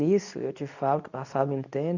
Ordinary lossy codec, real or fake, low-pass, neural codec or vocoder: none; fake; 7.2 kHz; codec, 24 kHz, 0.9 kbps, WavTokenizer, medium speech release version 2